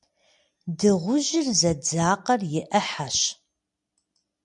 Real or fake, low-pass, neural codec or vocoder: real; 10.8 kHz; none